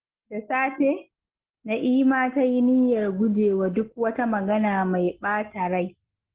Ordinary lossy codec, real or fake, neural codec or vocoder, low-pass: Opus, 16 kbps; real; none; 3.6 kHz